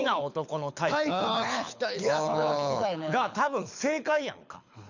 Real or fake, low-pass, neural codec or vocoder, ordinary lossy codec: fake; 7.2 kHz; codec, 24 kHz, 6 kbps, HILCodec; none